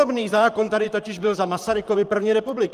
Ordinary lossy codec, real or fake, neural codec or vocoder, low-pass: Opus, 32 kbps; fake; vocoder, 44.1 kHz, 128 mel bands, Pupu-Vocoder; 14.4 kHz